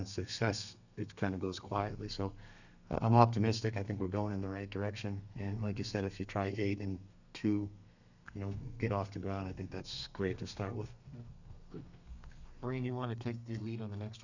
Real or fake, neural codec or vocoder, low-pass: fake; codec, 32 kHz, 1.9 kbps, SNAC; 7.2 kHz